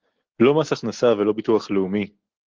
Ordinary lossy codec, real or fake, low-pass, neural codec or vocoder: Opus, 16 kbps; real; 7.2 kHz; none